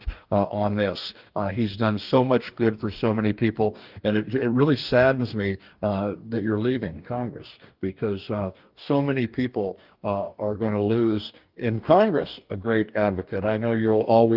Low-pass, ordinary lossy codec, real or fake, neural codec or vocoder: 5.4 kHz; Opus, 32 kbps; fake; codec, 44.1 kHz, 2.6 kbps, DAC